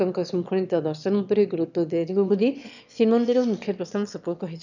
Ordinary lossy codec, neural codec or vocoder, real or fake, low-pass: none; autoencoder, 22.05 kHz, a latent of 192 numbers a frame, VITS, trained on one speaker; fake; 7.2 kHz